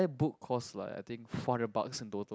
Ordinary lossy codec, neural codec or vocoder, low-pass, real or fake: none; none; none; real